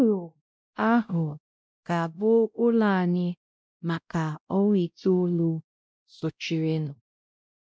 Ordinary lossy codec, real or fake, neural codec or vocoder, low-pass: none; fake; codec, 16 kHz, 0.5 kbps, X-Codec, WavLM features, trained on Multilingual LibriSpeech; none